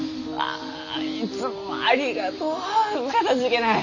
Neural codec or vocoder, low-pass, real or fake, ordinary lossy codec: none; 7.2 kHz; real; none